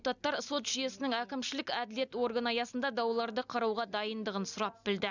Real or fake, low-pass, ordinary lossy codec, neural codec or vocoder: real; 7.2 kHz; none; none